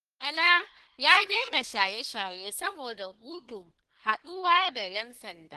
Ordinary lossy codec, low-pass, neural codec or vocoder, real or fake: Opus, 16 kbps; 10.8 kHz; codec, 24 kHz, 1 kbps, SNAC; fake